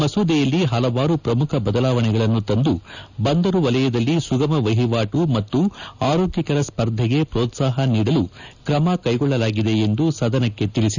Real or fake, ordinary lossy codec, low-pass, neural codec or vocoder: real; none; 7.2 kHz; none